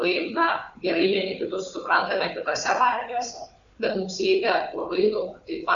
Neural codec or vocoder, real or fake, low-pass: codec, 16 kHz, 4 kbps, FunCodec, trained on Chinese and English, 50 frames a second; fake; 7.2 kHz